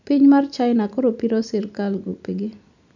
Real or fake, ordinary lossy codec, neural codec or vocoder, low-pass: real; none; none; 7.2 kHz